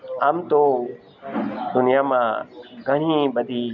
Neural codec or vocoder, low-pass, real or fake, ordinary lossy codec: none; 7.2 kHz; real; none